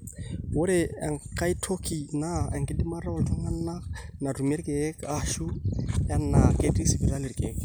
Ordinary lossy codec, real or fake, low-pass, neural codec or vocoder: none; real; none; none